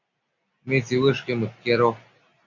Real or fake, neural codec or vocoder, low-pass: real; none; 7.2 kHz